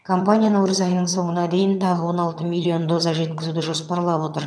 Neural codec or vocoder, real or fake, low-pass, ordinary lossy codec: vocoder, 22.05 kHz, 80 mel bands, HiFi-GAN; fake; none; none